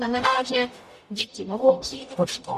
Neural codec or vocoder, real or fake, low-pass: codec, 44.1 kHz, 0.9 kbps, DAC; fake; 14.4 kHz